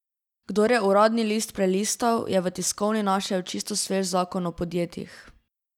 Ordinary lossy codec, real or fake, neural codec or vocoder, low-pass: none; real; none; 19.8 kHz